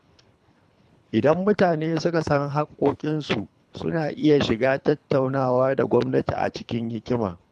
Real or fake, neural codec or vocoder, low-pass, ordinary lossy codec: fake; codec, 24 kHz, 3 kbps, HILCodec; none; none